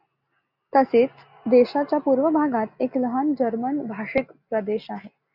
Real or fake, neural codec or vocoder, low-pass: real; none; 5.4 kHz